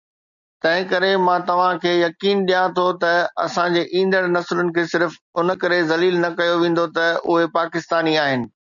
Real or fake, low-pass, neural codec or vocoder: real; 7.2 kHz; none